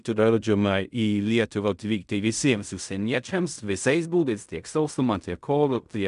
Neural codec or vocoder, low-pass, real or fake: codec, 16 kHz in and 24 kHz out, 0.4 kbps, LongCat-Audio-Codec, fine tuned four codebook decoder; 10.8 kHz; fake